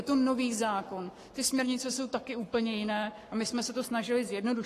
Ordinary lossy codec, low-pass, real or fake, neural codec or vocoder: AAC, 48 kbps; 14.4 kHz; fake; codec, 44.1 kHz, 7.8 kbps, Pupu-Codec